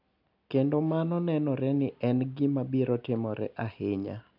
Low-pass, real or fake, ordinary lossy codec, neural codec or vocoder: 5.4 kHz; real; none; none